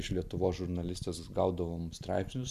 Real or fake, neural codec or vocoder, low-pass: real; none; 14.4 kHz